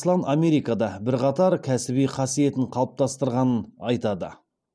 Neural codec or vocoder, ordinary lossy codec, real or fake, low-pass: none; none; real; none